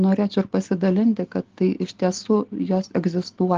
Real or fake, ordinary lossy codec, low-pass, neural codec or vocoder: real; Opus, 16 kbps; 7.2 kHz; none